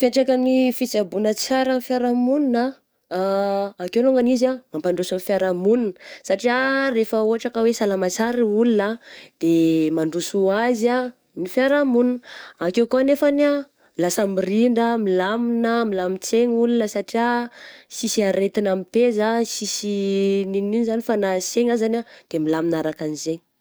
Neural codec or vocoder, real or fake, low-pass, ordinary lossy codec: codec, 44.1 kHz, 7.8 kbps, DAC; fake; none; none